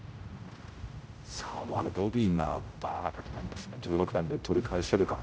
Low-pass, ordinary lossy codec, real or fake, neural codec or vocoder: none; none; fake; codec, 16 kHz, 0.5 kbps, X-Codec, HuBERT features, trained on general audio